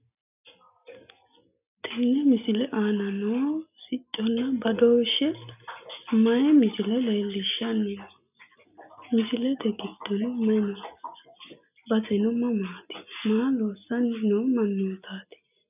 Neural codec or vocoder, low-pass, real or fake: none; 3.6 kHz; real